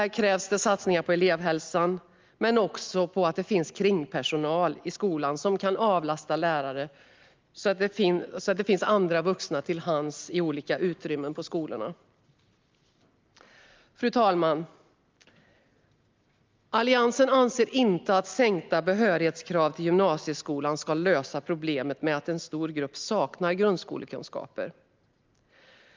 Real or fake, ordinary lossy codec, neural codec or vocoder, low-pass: real; Opus, 32 kbps; none; 7.2 kHz